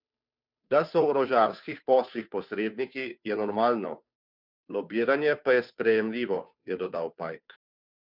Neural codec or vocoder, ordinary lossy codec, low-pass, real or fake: codec, 16 kHz, 2 kbps, FunCodec, trained on Chinese and English, 25 frames a second; none; 5.4 kHz; fake